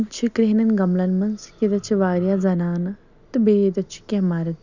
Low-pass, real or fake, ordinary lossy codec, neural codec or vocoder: 7.2 kHz; real; none; none